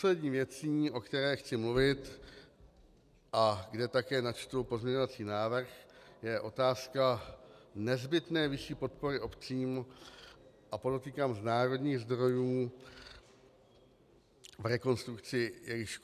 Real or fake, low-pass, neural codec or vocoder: real; 14.4 kHz; none